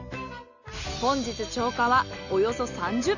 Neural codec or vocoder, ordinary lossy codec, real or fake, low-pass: none; none; real; 7.2 kHz